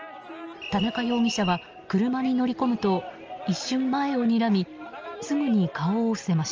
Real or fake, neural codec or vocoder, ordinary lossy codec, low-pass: real; none; Opus, 24 kbps; 7.2 kHz